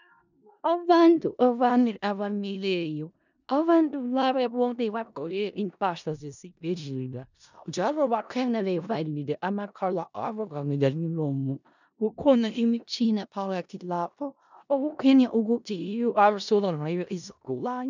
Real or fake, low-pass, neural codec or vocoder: fake; 7.2 kHz; codec, 16 kHz in and 24 kHz out, 0.4 kbps, LongCat-Audio-Codec, four codebook decoder